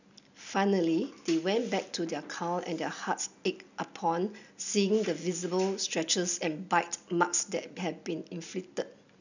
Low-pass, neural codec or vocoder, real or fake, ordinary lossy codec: 7.2 kHz; none; real; none